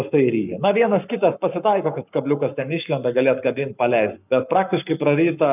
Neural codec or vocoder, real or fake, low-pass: codec, 44.1 kHz, 7.8 kbps, Pupu-Codec; fake; 3.6 kHz